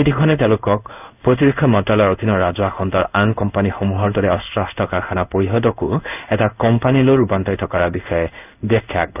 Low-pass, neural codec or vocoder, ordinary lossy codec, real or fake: 3.6 kHz; codec, 16 kHz in and 24 kHz out, 1 kbps, XY-Tokenizer; none; fake